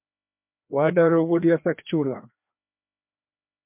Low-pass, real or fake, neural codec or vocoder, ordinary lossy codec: 3.6 kHz; fake; codec, 16 kHz, 2 kbps, FreqCodec, larger model; MP3, 32 kbps